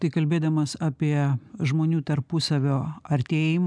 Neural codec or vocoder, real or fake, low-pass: none; real; 9.9 kHz